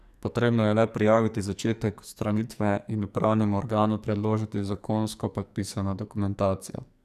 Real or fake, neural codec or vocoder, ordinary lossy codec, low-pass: fake; codec, 44.1 kHz, 2.6 kbps, SNAC; none; 14.4 kHz